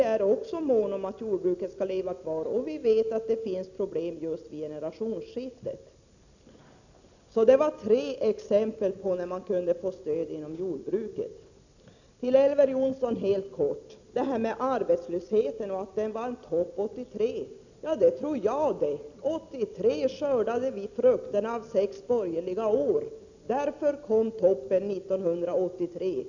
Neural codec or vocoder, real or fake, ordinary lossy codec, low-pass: none; real; none; 7.2 kHz